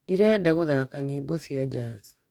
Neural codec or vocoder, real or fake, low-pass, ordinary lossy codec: codec, 44.1 kHz, 2.6 kbps, DAC; fake; 19.8 kHz; MP3, 96 kbps